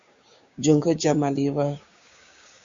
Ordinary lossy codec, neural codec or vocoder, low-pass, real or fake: Opus, 64 kbps; codec, 16 kHz, 6 kbps, DAC; 7.2 kHz; fake